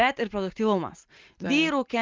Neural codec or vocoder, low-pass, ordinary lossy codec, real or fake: none; 7.2 kHz; Opus, 24 kbps; real